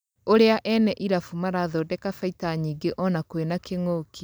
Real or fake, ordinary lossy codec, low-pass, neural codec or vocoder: real; none; none; none